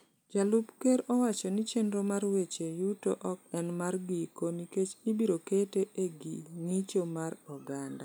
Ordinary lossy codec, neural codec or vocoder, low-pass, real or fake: none; none; none; real